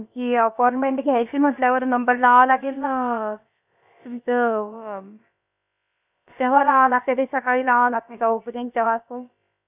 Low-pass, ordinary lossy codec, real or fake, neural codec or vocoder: 3.6 kHz; none; fake; codec, 16 kHz, about 1 kbps, DyCAST, with the encoder's durations